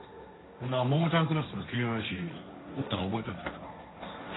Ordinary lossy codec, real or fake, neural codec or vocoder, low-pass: AAC, 16 kbps; fake; codec, 16 kHz, 1.1 kbps, Voila-Tokenizer; 7.2 kHz